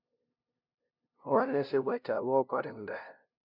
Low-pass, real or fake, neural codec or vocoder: 5.4 kHz; fake; codec, 16 kHz, 0.5 kbps, FunCodec, trained on LibriTTS, 25 frames a second